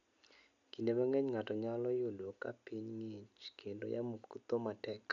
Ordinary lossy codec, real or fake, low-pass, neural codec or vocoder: none; real; 7.2 kHz; none